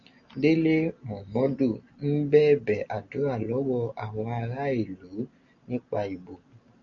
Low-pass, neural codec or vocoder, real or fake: 7.2 kHz; none; real